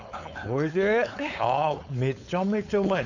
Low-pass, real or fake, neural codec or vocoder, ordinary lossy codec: 7.2 kHz; fake; codec, 16 kHz, 4.8 kbps, FACodec; none